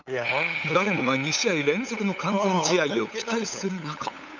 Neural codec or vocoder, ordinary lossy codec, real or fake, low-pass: codec, 16 kHz, 8 kbps, FunCodec, trained on LibriTTS, 25 frames a second; none; fake; 7.2 kHz